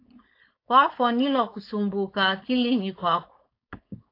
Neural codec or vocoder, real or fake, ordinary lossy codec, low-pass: codec, 16 kHz, 4.8 kbps, FACodec; fake; AAC, 32 kbps; 5.4 kHz